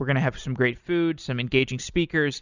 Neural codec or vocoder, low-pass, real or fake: none; 7.2 kHz; real